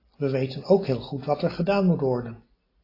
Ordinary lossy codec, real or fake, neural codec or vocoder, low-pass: AAC, 24 kbps; real; none; 5.4 kHz